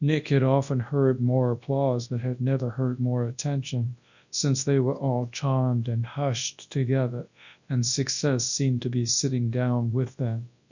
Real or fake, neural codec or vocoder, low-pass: fake; codec, 24 kHz, 0.9 kbps, WavTokenizer, large speech release; 7.2 kHz